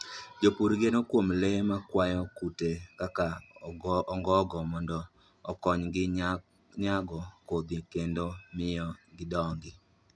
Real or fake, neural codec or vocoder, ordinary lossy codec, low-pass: real; none; none; none